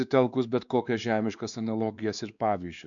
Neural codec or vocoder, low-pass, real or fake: codec, 16 kHz, 2 kbps, X-Codec, WavLM features, trained on Multilingual LibriSpeech; 7.2 kHz; fake